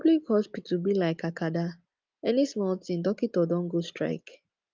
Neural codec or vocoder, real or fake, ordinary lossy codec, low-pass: none; real; Opus, 24 kbps; 7.2 kHz